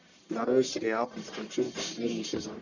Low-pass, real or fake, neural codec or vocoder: 7.2 kHz; fake; codec, 44.1 kHz, 1.7 kbps, Pupu-Codec